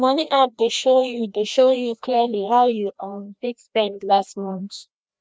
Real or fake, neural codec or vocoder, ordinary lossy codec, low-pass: fake; codec, 16 kHz, 1 kbps, FreqCodec, larger model; none; none